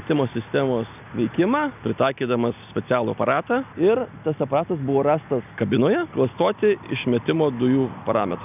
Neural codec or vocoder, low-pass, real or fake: none; 3.6 kHz; real